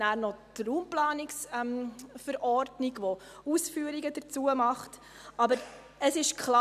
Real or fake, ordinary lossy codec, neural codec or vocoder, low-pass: real; none; none; 14.4 kHz